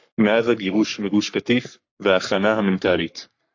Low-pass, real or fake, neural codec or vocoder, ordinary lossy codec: 7.2 kHz; fake; codec, 44.1 kHz, 3.4 kbps, Pupu-Codec; AAC, 48 kbps